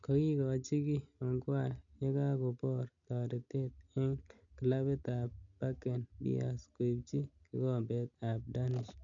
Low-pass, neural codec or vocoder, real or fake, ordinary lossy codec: 7.2 kHz; none; real; none